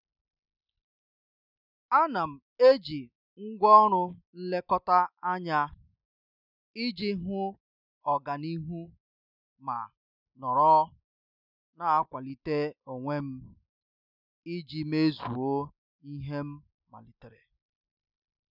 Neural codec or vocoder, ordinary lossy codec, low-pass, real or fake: none; none; 5.4 kHz; real